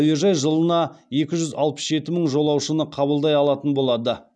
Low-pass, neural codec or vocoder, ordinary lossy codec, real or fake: none; none; none; real